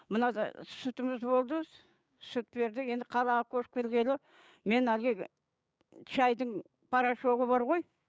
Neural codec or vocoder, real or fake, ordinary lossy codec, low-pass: codec, 16 kHz, 6 kbps, DAC; fake; none; none